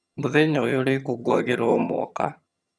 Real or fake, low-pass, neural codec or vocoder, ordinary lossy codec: fake; none; vocoder, 22.05 kHz, 80 mel bands, HiFi-GAN; none